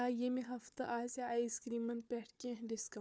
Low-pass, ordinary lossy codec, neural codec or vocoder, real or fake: none; none; codec, 16 kHz, 4 kbps, FunCodec, trained on Chinese and English, 50 frames a second; fake